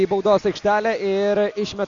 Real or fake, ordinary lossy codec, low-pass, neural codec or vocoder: real; AAC, 48 kbps; 7.2 kHz; none